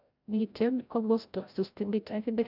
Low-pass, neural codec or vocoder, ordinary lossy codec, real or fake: 5.4 kHz; codec, 16 kHz, 0.5 kbps, FreqCodec, larger model; none; fake